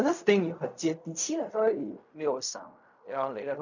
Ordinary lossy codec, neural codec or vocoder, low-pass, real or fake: none; codec, 16 kHz in and 24 kHz out, 0.4 kbps, LongCat-Audio-Codec, fine tuned four codebook decoder; 7.2 kHz; fake